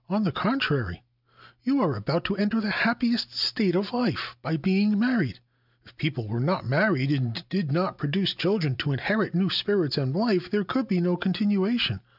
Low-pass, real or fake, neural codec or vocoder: 5.4 kHz; real; none